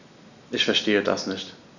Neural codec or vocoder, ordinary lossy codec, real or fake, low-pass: none; none; real; 7.2 kHz